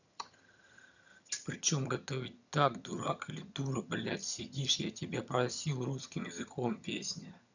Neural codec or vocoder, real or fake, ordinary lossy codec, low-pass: vocoder, 22.05 kHz, 80 mel bands, HiFi-GAN; fake; none; 7.2 kHz